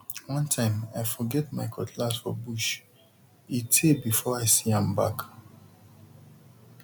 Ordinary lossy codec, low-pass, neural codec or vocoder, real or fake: none; 19.8 kHz; none; real